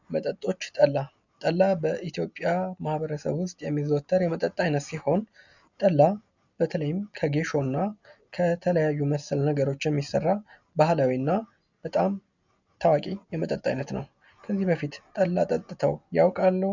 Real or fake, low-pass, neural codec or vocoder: real; 7.2 kHz; none